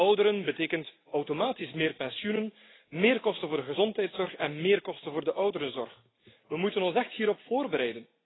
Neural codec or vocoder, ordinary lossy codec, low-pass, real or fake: none; AAC, 16 kbps; 7.2 kHz; real